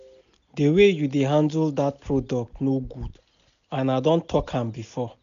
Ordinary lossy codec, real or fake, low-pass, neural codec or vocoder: none; real; 7.2 kHz; none